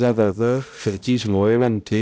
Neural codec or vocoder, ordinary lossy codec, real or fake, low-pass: codec, 16 kHz, 0.5 kbps, X-Codec, HuBERT features, trained on balanced general audio; none; fake; none